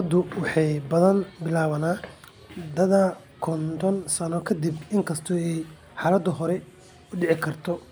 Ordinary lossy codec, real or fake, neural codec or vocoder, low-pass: none; real; none; none